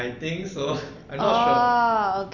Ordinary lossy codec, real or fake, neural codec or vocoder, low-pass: none; real; none; 7.2 kHz